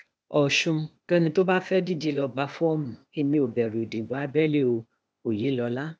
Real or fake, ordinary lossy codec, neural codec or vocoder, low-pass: fake; none; codec, 16 kHz, 0.8 kbps, ZipCodec; none